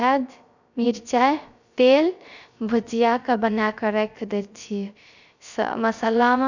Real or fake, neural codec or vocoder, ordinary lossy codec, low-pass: fake; codec, 16 kHz, 0.3 kbps, FocalCodec; none; 7.2 kHz